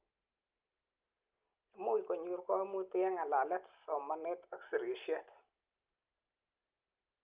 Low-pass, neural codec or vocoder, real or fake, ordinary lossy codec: 3.6 kHz; none; real; Opus, 24 kbps